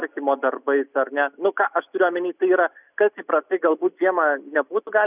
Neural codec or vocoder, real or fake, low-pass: none; real; 3.6 kHz